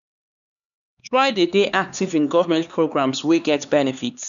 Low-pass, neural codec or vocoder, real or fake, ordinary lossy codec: 7.2 kHz; codec, 16 kHz, 4 kbps, X-Codec, HuBERT features, trained on LibriSpeech; fake; none